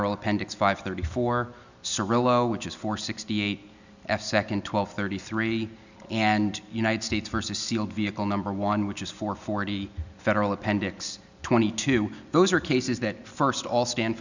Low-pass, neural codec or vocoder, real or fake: 7.2 kHz; none; real